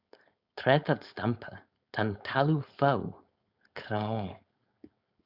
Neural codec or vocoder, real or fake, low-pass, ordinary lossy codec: codec, 16 kHz, 4.8 kbps, FACodec; fake; 5.4 kHz; Opus, 64 kbps